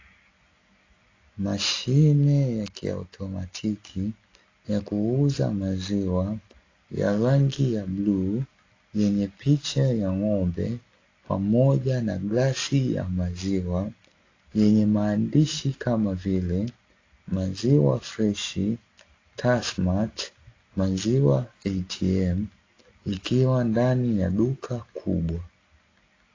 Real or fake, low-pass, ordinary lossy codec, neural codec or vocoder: real; 7.2 kHz; AAC, 32 kbps; none